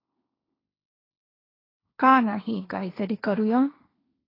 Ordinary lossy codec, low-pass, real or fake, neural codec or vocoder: AAC, 24 kbps; 5.4 kHz; fake; codec, 16 kHz, 1.1 kbps, Voila-Tokenizer